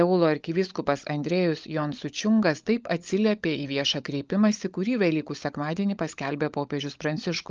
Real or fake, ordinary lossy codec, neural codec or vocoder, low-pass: fake; Opus, 24 kbps; codec, 16 kHz, 16 kbps, FunCodec, trained on LibriTTS, 50 frames a second; 7.2 kHz